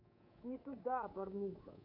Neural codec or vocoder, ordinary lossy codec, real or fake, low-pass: codec, 24 kHz, 3.1 kbps, DualCodec; none; fake; 5.4 kHz